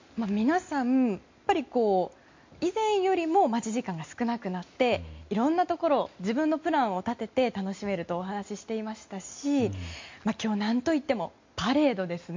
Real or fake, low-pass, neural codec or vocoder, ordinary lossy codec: real; 7.2 kHz; none; MP3, 48 kbps